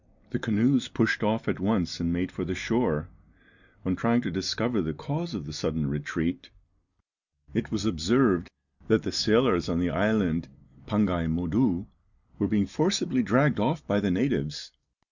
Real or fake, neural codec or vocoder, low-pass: real; none; 7.2 kHz